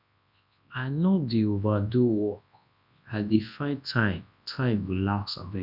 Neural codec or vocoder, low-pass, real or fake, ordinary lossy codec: codec, 24 kHz, 0.9 kbps, WavTokenizer, large speech release; 5.4 kHz; fake; none